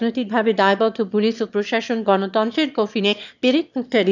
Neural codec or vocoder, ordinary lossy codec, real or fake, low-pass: autoencoder, 22.05 kHz, a latent of 192 numbers a frame, VITS, trained on one speaker; none; fake; 7.2 kHz